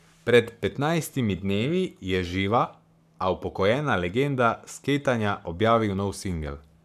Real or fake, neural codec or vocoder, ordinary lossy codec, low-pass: fake; codec, 44.1 kHz, 7.8 kbps, Pupu-Codec; none; 14.4 kHz